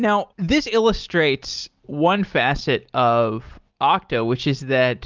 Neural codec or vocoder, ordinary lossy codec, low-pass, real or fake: none; Opus, 24 kbps; 7.2 kHz; real